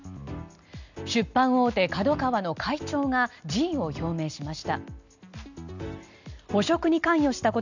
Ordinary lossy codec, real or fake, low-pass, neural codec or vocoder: none; real; 7.2 kHz; none